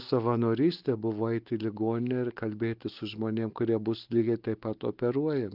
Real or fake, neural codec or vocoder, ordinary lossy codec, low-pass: real; none; Opus, 32 kbps; 5.4 kHz